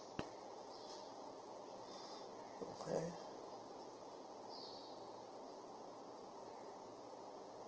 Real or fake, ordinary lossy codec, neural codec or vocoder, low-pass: real; Opus, 16 kbps; none; 7.2 kHz